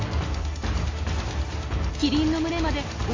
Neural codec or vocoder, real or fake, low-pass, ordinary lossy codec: none; real; 7.2 kHz; AAC, 32 kbps